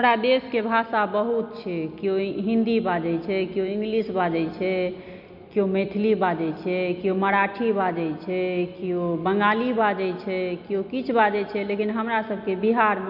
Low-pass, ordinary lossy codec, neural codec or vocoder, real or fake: 5.4 kHz; none; none; real